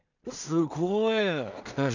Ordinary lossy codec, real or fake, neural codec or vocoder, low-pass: none; fake; codec, 16 kHz in and 24 kHz out, 0.4 kbps, LongCat-Audio-Codec, two codebook decoder; 7.2 kHz